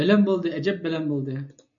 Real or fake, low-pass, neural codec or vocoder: real; 7.2 kHz; none